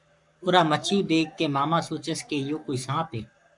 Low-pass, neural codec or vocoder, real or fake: 10.8 kHz; codec, 44.1 kHz, 7.8 kbps, Pupu-Codec; fake